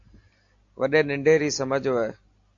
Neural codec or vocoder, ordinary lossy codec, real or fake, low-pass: none; AAC, 48 kbps; real; 7.2 kHz